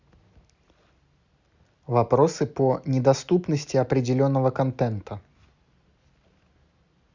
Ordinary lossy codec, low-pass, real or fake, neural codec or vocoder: Opus, 64 kbps; 7.2 kHz; real; none